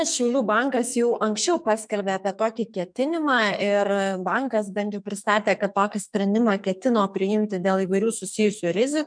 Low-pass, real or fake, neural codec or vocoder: 9.9 kHz; fake; codec, 44.1 kHz, 2.6 kbps, SNAC